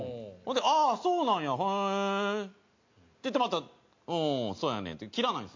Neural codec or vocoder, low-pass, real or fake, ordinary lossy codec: none; 7.2 kHz; real; MP3, 48 kbps